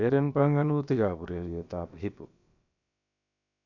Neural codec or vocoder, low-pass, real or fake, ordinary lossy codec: codec, 16 kHz, about 1 kbps, DyCAST, with the encoder's durations; 7.2 kHz; fake; none